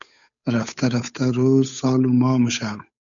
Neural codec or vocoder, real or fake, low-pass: codec, 16 kHz, 8 kbps, FunCodec, trained on Chinese and English, 25 frames a second; fake; 7.2 kHz